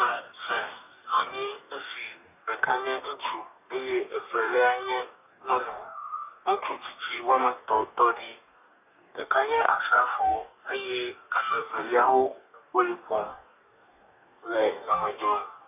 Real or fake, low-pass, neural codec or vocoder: fake; 3.6 kHz; codec, 44.1 kHz, 2.6 kbps, DAC